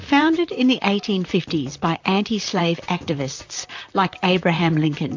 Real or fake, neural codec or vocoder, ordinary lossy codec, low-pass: fake; vocoder, 44.1 kHz, 128 mel bands, Pupu-Vocoder; AAC, 48 kbps; 7.2 kHz